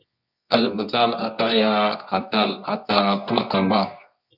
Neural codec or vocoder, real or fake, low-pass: codec, 24 kHz, 0.9 kbps, WavTokenizer, medium music audio release; fake; 5.4 kHz